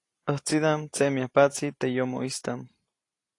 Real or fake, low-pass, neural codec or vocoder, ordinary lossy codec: real; 10.8 kHz; none; AAC, 48 kbps